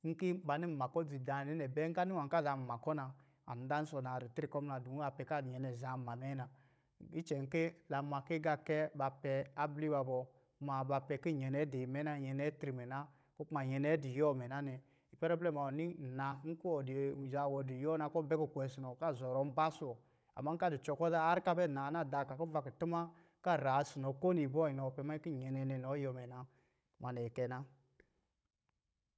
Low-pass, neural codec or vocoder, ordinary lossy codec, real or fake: none; codec, 16 kHz, 16 kbps, FunCodec, trained on Chinese and English, 50 frames a second; none; fake